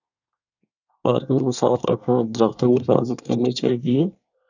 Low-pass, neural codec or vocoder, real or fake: 7.2 kHz; codec, 24 kHz, 1 kbps, SNAC; fake